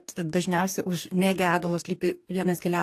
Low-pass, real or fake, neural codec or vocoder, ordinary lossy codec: 14.4 kHz; fake; codec, 44.1 kHz, 2.6 kbps, DAC; AAC, 64 kbps